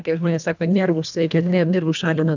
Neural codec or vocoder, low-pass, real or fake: codec, 24 kHz, 1.5 kbps, HILCodec; 7.2 kHz; fake